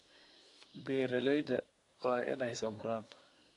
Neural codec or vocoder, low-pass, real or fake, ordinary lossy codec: codec, 24 kHz, 1 kbps, SNAC; 10.8 kHz; fake; AAC, 48 kbps